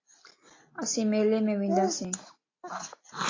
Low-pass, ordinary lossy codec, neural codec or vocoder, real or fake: 7.2 kHz; AAC, 32 kbps; none; real